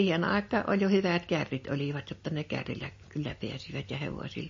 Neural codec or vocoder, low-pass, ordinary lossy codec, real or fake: none; 7.2 kHz; MP3, 32 kbps; real